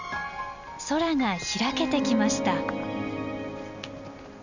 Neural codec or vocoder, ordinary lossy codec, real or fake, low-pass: none; none; real; 7.2 kHz